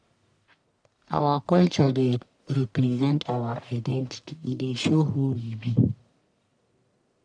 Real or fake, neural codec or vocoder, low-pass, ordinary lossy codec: fake; codec, 44.1 kHz, 1.7 kbps, Pupu-Codec; 9.9 kHz; none